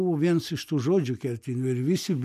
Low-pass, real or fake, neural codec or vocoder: 14.4 kHz; real; none